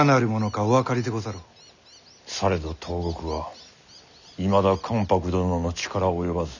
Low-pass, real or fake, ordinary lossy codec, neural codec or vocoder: 7.2 kHz; real; none; none